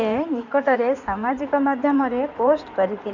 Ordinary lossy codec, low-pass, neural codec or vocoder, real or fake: none; 7.2 kHz; codec, 16 kHz in and 24 kHz out, 2.2 kbps, FireRedTTS-2 codec; fake